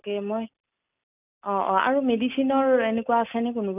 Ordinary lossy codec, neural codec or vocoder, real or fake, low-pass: none; none; real; 3.6 kHz